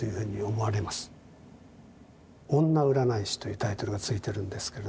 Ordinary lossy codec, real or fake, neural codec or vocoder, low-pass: none; real; none; none